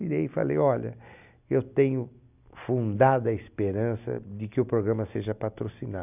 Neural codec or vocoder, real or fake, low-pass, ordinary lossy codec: none; real; 3.6 kHz; none